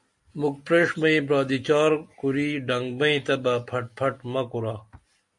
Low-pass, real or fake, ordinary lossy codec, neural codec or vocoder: 10.8 kHz; real; MP3, 48 kbps; none